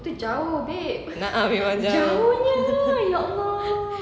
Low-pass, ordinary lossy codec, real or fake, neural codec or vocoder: none; none; real; none